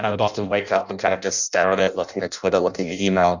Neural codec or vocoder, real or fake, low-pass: codec, 16 kHz in and 24 kHz out, 0.6 kbps, FireRedTTS-2 codec; fake; 7.2 kHz